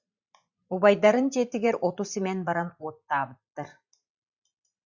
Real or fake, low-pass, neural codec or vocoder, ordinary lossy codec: fake; 7.2 kHz; vocoder, 44.1 kHz, 128 mel bands every 512 samples, BigVGAN v2; Opus, 64 kbps